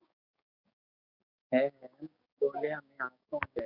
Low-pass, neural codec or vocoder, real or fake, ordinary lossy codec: 5.4 kHz; codec, 16 kHz, 6 kbps, DAC; fake; Opus, 64 kbps